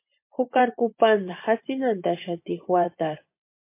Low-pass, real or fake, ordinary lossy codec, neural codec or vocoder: 3.6 kHz; fake; MP3, 24 kbps; vocoder, 24 kHz, 100 mel bands, Vocos